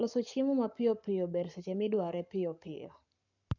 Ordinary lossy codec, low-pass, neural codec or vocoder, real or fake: none; 7.2 kHz; codec, 44.1 kHz, 7.8 kbps, Pupu-Codec; fake